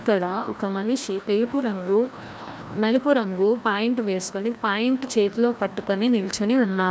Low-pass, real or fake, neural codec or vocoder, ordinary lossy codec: none; fake; codec, 16 kHz, 1 kbps, FreqCodec, larger model; none